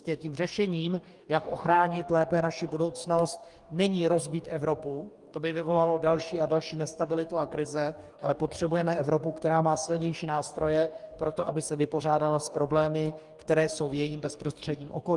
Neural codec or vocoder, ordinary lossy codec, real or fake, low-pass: codec, 44.1 kHz, 2.6 kbps, DAC; Opus, 32 kbps; fake; 10.8 kHz